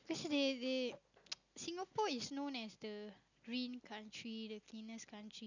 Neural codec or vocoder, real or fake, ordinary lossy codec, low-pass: none; real; none; 7.2 kHz